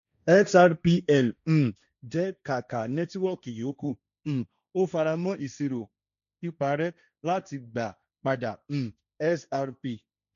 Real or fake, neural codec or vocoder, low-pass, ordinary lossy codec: fake; codec, 16 kHz, 1.1 kbps, Voila-Tokenizer; 7.2 kHz; none